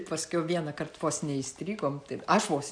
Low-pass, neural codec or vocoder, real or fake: 9.9 kHz; none; real